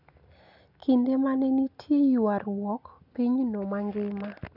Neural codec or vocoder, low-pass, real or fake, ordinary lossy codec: none; 5.4 kHz; real; none